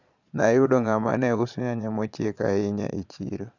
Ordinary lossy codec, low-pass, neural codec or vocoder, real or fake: none; 7.2 kHz; vocoder, 22.05 kHz, 80 mel bands, Vocos; fake